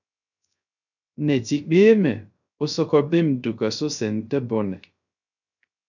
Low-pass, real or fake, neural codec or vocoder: 7.2 kHz; fake; codec, 16 kHz, 0.3 kbps, FocalCodec